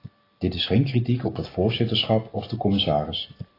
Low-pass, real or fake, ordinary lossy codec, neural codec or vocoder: 5.4 kHz; real; AAC, 24 kbps; none